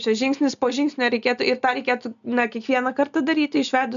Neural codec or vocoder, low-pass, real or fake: none; 7.2 kHz; real